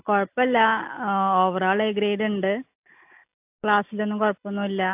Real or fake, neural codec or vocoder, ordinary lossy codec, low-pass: real; none; AAC, 32 kbps; 3.6 kHz